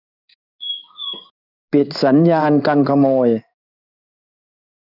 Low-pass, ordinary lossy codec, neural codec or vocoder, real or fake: 5.4 kHz; none; codec, 16 kHz in and 24 kHz out, 1 kbps, XY-Tokenizer; fake